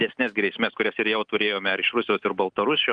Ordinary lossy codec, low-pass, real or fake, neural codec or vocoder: Opus, 32 kbps; 9.9 kHz; real; none